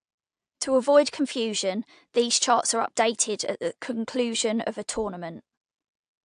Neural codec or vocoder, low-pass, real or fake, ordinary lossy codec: none; 9.9 kHz; real; AAC, 64 kbps